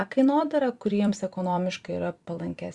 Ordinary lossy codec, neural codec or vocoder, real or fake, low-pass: Opus, 64 kbps; vocoder, 44.1 kHz, 128 mel bands every 256 samples, BigVGAN v2; fake; 10.8 kHz